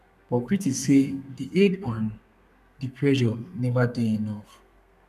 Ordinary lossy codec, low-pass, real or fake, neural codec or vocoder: none; 14.4 kHz; fake; codec, 32 kHz, 1.9 kbps, SNAC